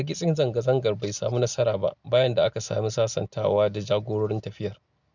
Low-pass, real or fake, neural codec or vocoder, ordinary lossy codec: 7.2 kHz; real; none; none